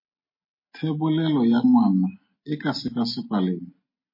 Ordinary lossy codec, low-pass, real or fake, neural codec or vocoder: MP3, 24 kbps; 5.4 kHz; real; none